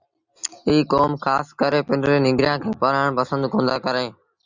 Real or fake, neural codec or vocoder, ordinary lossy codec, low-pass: real; none; Opus, 64 kbps; 7.2 kHz